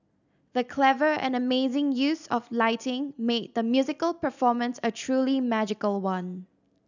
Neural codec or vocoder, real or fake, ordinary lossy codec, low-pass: none; real; none; 7.2 kHz